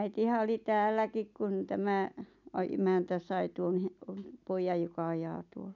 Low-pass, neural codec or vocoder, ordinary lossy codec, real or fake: 7.2 kHz; none; none; real